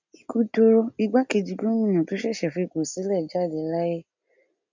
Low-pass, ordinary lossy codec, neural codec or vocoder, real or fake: 7.2 kHz; none; none; real